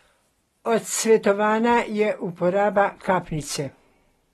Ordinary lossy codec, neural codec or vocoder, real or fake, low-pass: AAC, 32 kbps; none; real; 19.8 kHz